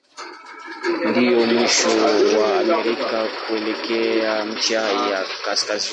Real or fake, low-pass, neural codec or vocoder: real; 10.8 kHz; none